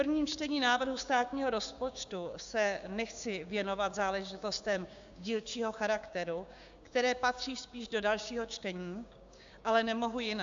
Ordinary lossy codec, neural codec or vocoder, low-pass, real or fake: AAC, 64 kbps; codec, 16 kHz, 6 kbps, DAC; 7.2 kHz; fake